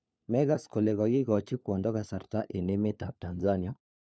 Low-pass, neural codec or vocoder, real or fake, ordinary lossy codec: none; codec, 16 kHz, 4 kbps, FunCodec, trained on LibriTTS, 50 frames a second; fake; none